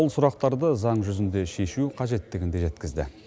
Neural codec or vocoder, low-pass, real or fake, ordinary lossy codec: none; none; real; none